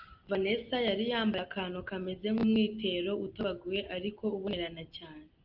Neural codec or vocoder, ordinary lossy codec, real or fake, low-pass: none; Opus, 24 kbps; real; 5.4 kHz